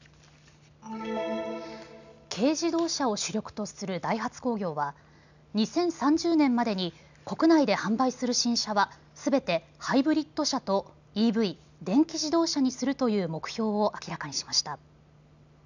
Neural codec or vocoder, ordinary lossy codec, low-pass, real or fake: none; none; 7.2 kHz; real